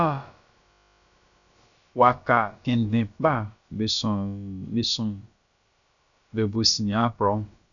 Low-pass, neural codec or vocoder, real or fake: 7.2 kHz; codec, 16 kHz, about 1 kbps, DyCAST, with the encoder's durations; fake